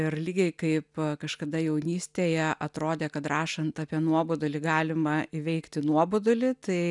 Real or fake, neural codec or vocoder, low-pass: real; none; 10.8 kHz